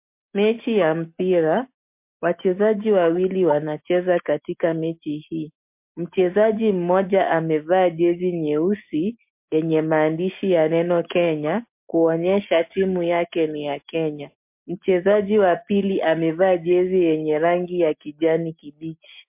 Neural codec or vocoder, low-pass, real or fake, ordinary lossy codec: none; 3.6 kHz; real; MP3, 32 kbps